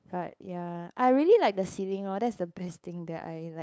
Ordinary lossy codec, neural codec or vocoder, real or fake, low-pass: none; codec, 16 kHz, 8 kbps, FunCodec, trained on LibriTTS, 25 frames a second; fake; none